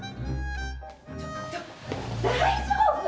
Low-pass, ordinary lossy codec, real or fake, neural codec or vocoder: none; none; real; none